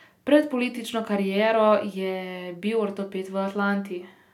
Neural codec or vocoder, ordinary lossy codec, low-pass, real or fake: none; none; 19.8 kHz; real